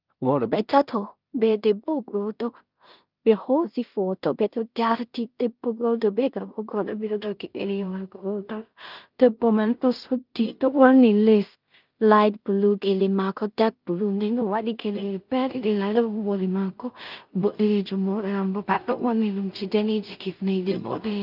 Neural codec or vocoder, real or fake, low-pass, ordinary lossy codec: codec, 16 kHz in and 24 kHz out, 0.4 kbps, LongCat-Audio-Codec, two codebook decoder; fake; 5.4 kHz; Opus, 24 kbps